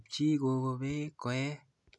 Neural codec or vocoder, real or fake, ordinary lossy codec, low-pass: none; real; none; 10.8 kHz